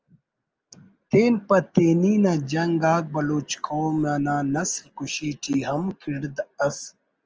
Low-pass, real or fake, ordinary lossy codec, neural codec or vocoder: 7.2 kHz; real; Opus, 24 kbps; none